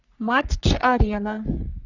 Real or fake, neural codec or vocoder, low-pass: fake; codec, 44.1 kHz, 3.4 kbps, Pupu-Codec; 7.2 kHz